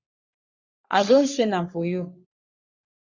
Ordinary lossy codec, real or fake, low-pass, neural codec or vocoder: Opus, 64 kbps; fake; 7.2 kHz; codec, 44.1 kHz, 3.4 kbps, Pupu-Codec